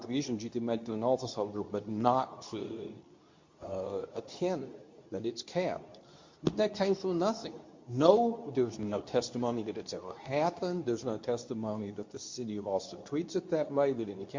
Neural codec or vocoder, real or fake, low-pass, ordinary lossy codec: codec, 24 kHz, 0.9 kbps, WavTokenizer, medium speech release version 2; fake; 7.2 kHz; MP3, 48 kbps